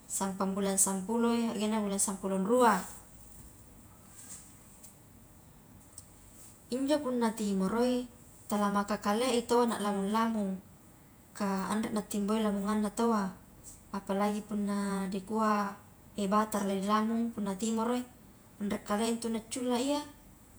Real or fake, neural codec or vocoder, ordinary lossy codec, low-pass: fake; vocoder, 48 kHz, 128 mel bands, Vocos; none; none